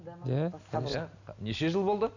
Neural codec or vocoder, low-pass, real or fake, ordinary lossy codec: none; 7.2 kHz; real; none